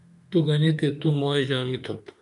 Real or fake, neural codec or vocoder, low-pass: fake; autoencoder, 48 kHz, 32 numbers a frame, DAC-VAE, trained on Japanese speech; 10.8 kHz